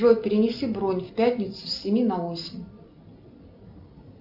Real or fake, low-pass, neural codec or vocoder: real; 5.4 kHz; none